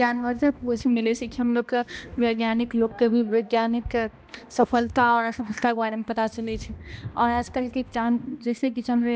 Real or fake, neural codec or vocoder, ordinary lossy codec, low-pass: fake; codec, 16 kHz, 1 kbps, X-Codec, HuBERT features, trained on balanced general audio; none; none